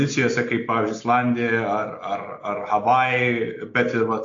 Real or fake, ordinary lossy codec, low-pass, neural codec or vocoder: real; AAC, 48 kbps; 7.2 kHz; none